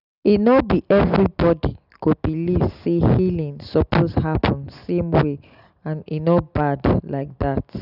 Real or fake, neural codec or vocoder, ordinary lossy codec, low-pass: real; none; none; 5.4 kHz